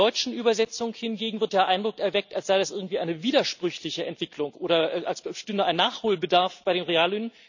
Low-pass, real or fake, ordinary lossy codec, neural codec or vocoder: 7.2 kHz; real; none; none